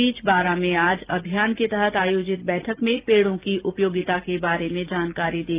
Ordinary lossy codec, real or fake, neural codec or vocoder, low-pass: Opus, 32 kbps; real; none; 3.6 kHz